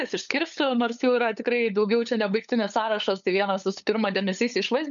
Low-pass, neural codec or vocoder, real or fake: 7.2 kHz; codec, 16 kHz, 8 kbps, FunCodec, trained on LibriTTS, 25 frames a second; fake